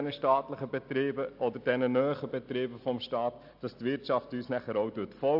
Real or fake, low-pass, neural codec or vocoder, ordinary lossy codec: real; 5.4 kHz; none; AAC, 48 kbps